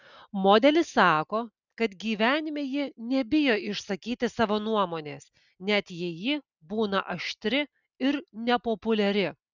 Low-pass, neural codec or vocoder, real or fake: 7.2 kHz; none; real